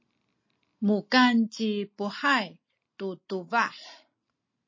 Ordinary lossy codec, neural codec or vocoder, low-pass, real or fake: MP3, 32 kbps; none; 7.2 kHz; real